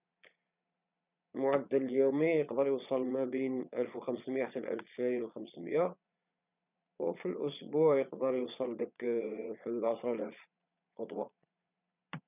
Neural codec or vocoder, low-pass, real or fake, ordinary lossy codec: vocoder, 22.05 kHz, 80 mel bands, Vocos; 3.6 kHz; fake; none